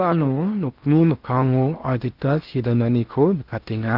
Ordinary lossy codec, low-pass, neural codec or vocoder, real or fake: Opus, 32 kbps; 5.4 kHz; codec, 16 kHz in and 24 kHz out, 0.8 kbps, FocalCodec, streaming, 65536 codes; fake